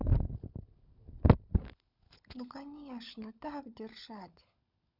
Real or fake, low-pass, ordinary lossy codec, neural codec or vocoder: fake; 5.4 kHz; none; codec, 16 kHz, 16 kbps, FunCodec, trained on LibriTTS, 50 frames a second